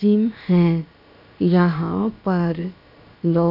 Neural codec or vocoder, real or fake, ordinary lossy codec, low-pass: codec, 16 kHz, about 1 kbps, DyCAST, with the encoder's durations; fake; none; 5.4 kHz